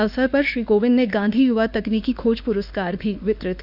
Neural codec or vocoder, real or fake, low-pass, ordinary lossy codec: autoencoder, 48 kHz, 32 numbers a frame, DAC-VAE, trained on Japanese speech; fake; 5.4 kHz; none